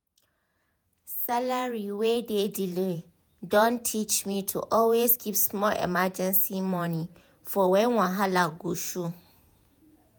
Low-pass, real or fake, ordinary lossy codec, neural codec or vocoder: none; fake; none; vocoder, 48 kHz, 128 mel bands, Vocos